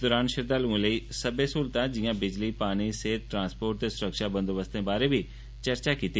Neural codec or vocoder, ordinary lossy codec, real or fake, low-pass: none; none; real; none